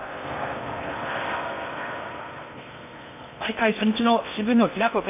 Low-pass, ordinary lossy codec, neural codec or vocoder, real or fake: 3.6 kHz; MP3, 32 kbps; codec, 16 kHz in and 24 kHz out, 0.6 kbps, FocalCodec, streaming, 4096 codes; fake